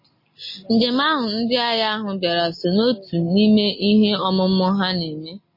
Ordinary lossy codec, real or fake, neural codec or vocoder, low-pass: MP3, 24 kbps; real; none; 5.4 kHz